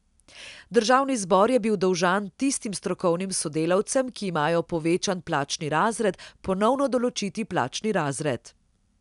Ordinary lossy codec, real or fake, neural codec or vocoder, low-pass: none; real; none; 10.8 kHz